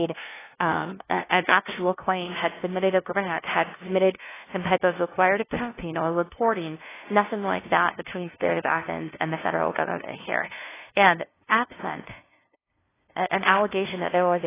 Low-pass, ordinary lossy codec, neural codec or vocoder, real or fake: 3.6 kHz; AAC, 16 kbps; codec, 16 kHz, 0.5 kbps, FunCodec, trained on LibriTTS, 25 frames a second; fake